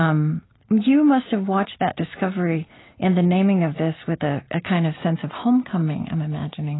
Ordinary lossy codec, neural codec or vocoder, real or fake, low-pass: AAC, 16 kbps; none; real; 7.2 kHz